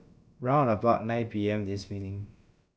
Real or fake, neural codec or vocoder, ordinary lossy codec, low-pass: fake; codec, 16 kHz, about 1 kbps, DyCAST, with the encoder's durations; none; none